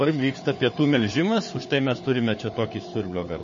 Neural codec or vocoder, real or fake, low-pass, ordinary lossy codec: codec, 16 kHz, 4 kbps, FunCodec, trained on Chinese and English, 50 frames a second; fake; 7.2 kHz; MP3, 32 kbps